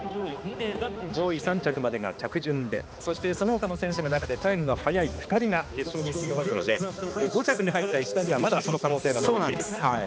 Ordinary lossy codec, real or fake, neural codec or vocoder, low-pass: none; fake; codec, 16 kHz, 2 kbps, X-Codec, HuBERT features, trained on balanced general audio; none